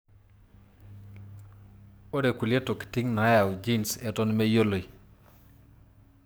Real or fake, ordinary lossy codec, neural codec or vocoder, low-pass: fake; none; codec, 44.1 kHz, 7.8 kbps, DAC; none